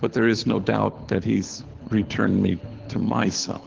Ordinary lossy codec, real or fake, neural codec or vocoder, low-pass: Opus, 16 kbps; fake; codec, 24 kHz, 6 kbps, HILCodec; 7.2 kHz